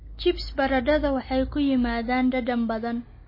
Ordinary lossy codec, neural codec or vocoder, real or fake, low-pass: MP3, 24 kbps; none; real; 5.4 kHz